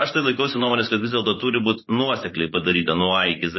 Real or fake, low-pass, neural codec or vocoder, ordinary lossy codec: fake; 7.2 kHz; vocoder, 24 kHz, 100 mel bands, Vocos; MP3, 24 kbps